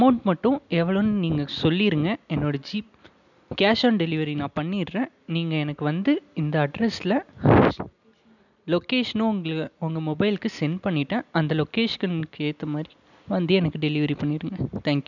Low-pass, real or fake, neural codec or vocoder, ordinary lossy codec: 7.2 kHz; real; none; none